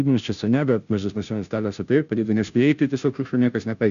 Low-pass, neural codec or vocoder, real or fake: 7.2 kHz; codec, 16 kHz, 0.5 kbps, FunCodec, trained on Chinese and English, 25 frames a second; fake